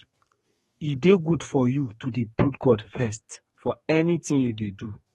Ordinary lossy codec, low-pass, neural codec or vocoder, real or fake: AAC, 32 kbps; 14.4 kHz; codec, 32 kHz, 1.9 kbps, SNAC; fake